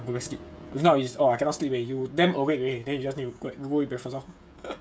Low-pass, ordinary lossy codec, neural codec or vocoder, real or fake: none; none; codec, 16 kHz, 16 kbps, FreqCodec, smaller model; fake